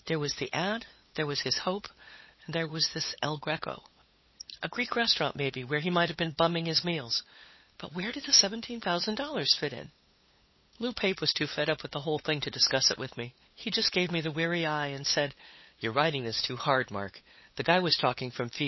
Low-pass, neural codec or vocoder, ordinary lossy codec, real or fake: 7.2 kHz; codec, 16 kHz, 8 kbps, FunCodec, trained on LibriTTS, 25 frames a second; MP3, 24 kbps; fake